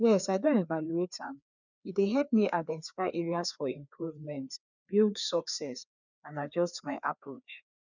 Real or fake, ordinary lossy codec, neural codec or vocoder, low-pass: fake; none; codec, 16 kHz, 2 kbps, FreqCodec, larger model; 7.2 kHz